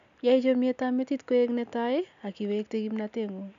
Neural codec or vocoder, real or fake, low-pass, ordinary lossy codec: none; real; 7.2 kHz; none